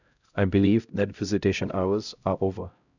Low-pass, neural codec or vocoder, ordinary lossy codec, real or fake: 7.2 kHz; codec, 16 kHz, 0.5 kbps, X-Codec, HuBERT features, trained on LibriSpeech; none; fake